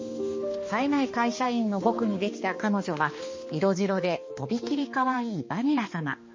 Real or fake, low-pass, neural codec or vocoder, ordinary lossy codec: fake; 7.2 kHz; codec, 16 kHz, 2 kbps, X-Codec, HuBERT features, trained on balanced general audio; MP3, 32 kbps